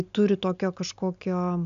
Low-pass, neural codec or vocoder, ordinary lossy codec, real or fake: 7.2 kHz; none; AAC, 96 kbps; real